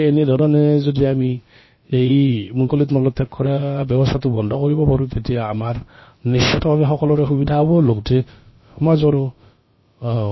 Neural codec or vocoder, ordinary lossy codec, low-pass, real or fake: codec, 16 kHz, about 1 kbps, DyCAST, with the encoder's durations; MP3, 24 kbps; 7.2 kHz; fake